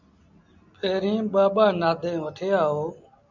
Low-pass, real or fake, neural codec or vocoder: 7.2 kHz; real; none